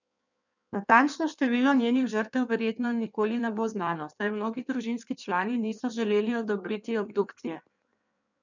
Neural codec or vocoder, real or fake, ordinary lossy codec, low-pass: codec, 16 kHz in and 24 kHz out, 1.1 kbps, FireRedTTS-2 codec; fake; none; 7.2 kHz